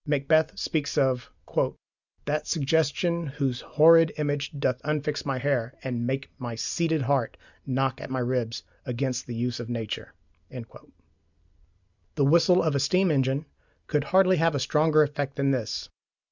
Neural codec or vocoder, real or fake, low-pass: none; real; 7.2 kHz